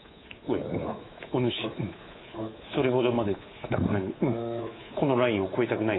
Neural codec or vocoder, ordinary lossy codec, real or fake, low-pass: codec, 24 kHz, 3.1 kbps, DualCodec; AAC, 16 kbps; fake; 7.2 kHz